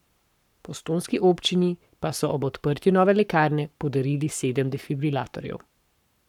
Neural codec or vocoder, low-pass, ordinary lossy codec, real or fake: codec, 44.1 kHz, 7.8 kbps, Pupu-Codec; 19.8 kHz; none; fake